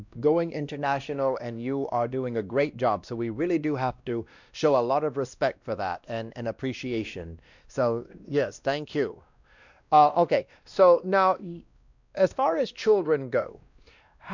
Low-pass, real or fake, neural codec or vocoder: 7.2 kHz; fake; codec, 16 kHz, 1 kbps, X-Codec, WavLM features, trained on Multilingual LibriSpeech